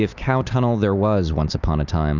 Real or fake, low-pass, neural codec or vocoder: real; 7.2 kHz; none